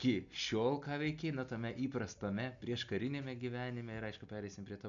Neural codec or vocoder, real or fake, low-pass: none; real; 7.2 kHz